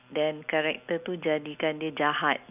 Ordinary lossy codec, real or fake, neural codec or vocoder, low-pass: none; real; none; 3.6 kHz